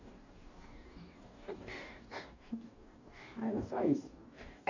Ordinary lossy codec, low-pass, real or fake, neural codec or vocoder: none; 7.2 kHz; fake; codec, 44.1 kHz, 2.6 kbps, DAC